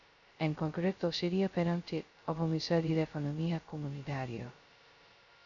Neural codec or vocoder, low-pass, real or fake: codec, 16 kHz, 0.2 kbps, FocalCodec; 7.2 kHz; fake